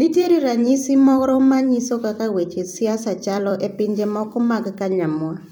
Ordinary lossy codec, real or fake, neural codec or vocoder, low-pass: none; fake; vocoder, 44.1 kHz, 128 mel bands every 256 samples, BigVGAN v2; 19.8 kHz